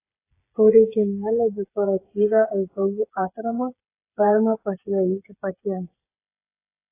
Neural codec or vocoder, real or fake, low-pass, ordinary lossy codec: codec, 16 kHz, 8 kbps, FreqCodec, smaller model; fake; 3.6 kHz; AAC, 24 kbps